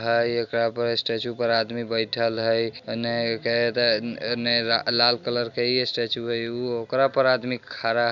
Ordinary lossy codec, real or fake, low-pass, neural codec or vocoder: none; real; 7.2 kHz; none